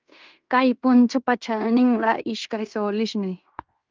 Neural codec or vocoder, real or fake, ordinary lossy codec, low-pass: codec, 16 kHz in and 24 kHz out, 0.9 kbps, LongCat-Audio-Codec, fine tuned four codebook decoder; fake; Opus, 24 kbps; 7.2 kHz